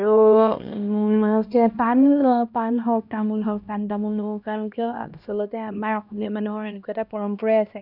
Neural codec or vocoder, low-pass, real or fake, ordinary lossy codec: codec, 16 kHz, 1 kbps, X-Codec, HuBERT features, trained on LibriSpeech; 5.4 kHz; fake; none